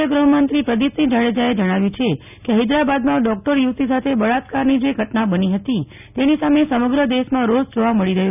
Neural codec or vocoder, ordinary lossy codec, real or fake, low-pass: none; none; real; 3.6 kHz